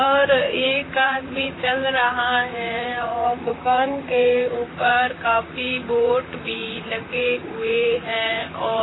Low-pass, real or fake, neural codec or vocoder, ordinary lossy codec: 7.2 kHz; fake; vocoder, 44.1 kHz, 128 mel bands, Pupu-Vocoder; AAC, 16 kbps